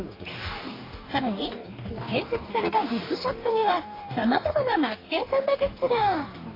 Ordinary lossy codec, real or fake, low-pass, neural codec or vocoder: AAC, 24 kbps; fake; 5.4 kHz; codec, 44.1 kHz, 2.6 kbps, DAC